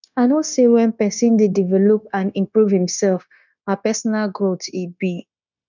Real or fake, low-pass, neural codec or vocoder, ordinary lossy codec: fake; 7.2 kHz; codec, 16 kHz, 0.9 kbps, LongCat-Audio-Codec; none